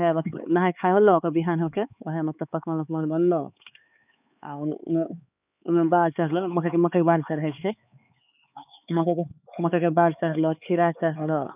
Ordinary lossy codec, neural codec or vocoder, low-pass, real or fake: none; codec, 16 kHz, 4 kbps, X-Codec, HuBERT features, trained on LibriSpeech; 3.6 kHz; fake